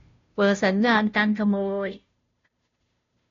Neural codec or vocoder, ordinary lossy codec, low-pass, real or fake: codec, 16 kHz, 0.5 kbps, FunCodec, trained on Chinese and English, 25 frames a second; AAC, 32 kbps; 7.2 kHz; fake